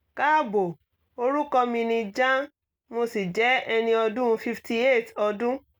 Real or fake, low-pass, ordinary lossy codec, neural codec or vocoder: real; none; none; none